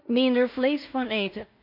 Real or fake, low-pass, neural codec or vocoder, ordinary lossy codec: fake; 5.4 kHz; codec, 16 kHz in and 24 kHz out, 0.4 kbps, LongCat-Audio-Codec, two codebook decoder; none